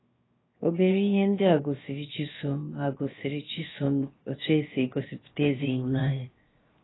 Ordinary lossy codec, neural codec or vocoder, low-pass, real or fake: AAC, 16 kbps; codec, 16 kHz, 0.7 kbps, FocalCodec; 7.2 kHz; fake